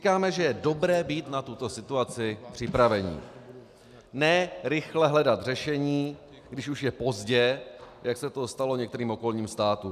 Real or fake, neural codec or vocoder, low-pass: real; none; 14.4 kHz